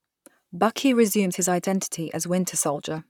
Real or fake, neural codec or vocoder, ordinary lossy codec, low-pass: fake; vocoder, 44.1 kHz, 128 mel bands, Pupu-Vocoder; none; 19.8 kHz